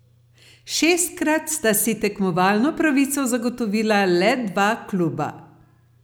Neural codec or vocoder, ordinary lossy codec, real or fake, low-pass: none; none; real; none